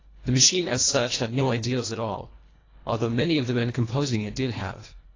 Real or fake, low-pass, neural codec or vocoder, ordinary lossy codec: fake; 7.2 kHz; codec, 24 kHz, 1.5 kbps, HILCodec; AAC, 32 kbps